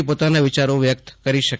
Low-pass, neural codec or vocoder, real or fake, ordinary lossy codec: none; none; real; none